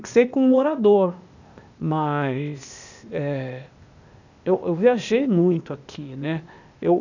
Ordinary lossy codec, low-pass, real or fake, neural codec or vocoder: none; 7.2 kHz; fake; codec, 16 kHz, 0.8 kbps, ZipCodec